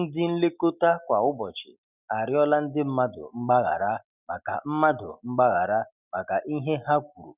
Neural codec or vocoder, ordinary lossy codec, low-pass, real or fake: none; none; 3.6 kHz; real